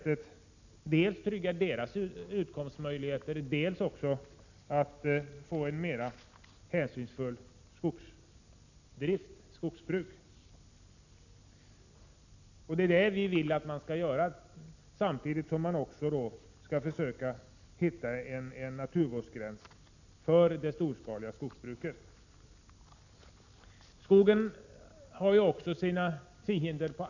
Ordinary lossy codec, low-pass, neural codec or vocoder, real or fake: none; 7.2 kHz; none; real